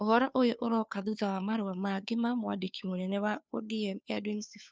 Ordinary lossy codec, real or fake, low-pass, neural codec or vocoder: none; fake; none; codec, 16 kHz, 2 kbps, FunCodec, trained on Chinese and English, 25 frames a second